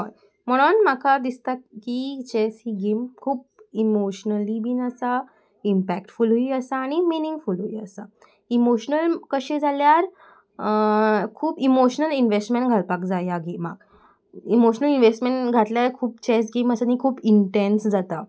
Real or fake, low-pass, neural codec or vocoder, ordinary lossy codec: real; none; none; none